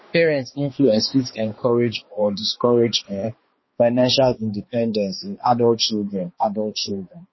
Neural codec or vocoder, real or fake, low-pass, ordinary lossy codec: codec, 16 kHz, 2 kbps, X-Codec, HuBERT features, trained on balanced general audio; fake; 7.2 kHz; MP3, 24 kbps